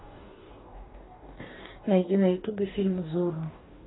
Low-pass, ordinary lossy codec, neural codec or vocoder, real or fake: 7.2 kHz; AAC, 16 kbps; codec, 44.1 kHz, 2.6 kbps, DAC; fake